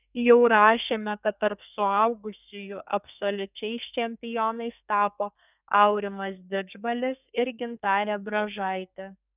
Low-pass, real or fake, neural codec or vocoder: 3.6 kHz; fake; codec, 32 kHz, 1.9 kbps, SNAC